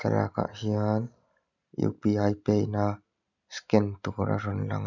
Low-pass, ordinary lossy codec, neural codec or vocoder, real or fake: 7.2 kHz; none; none; real